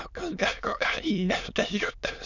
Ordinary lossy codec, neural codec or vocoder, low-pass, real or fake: none; autoencoder, 22.05 kHz, a latent of 192 numbers a frame, VITS, trained on many speakers; 7.2 kHz; fake